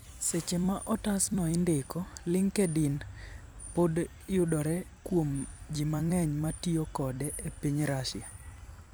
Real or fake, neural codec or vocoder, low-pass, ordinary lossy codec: fake; vocoder, 44.1 kHz, 128 mel bands every 256 samples, BigVGAN v2; none; none